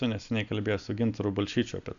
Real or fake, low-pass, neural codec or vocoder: real; 7.2 kHz; none